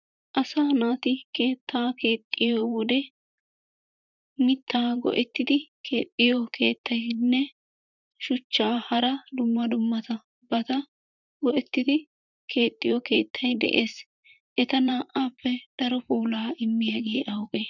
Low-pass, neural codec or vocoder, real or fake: 7.2 kHz; vocoder, 44.1 kHz, 128 mel bands, Pupu-Vocoder; fake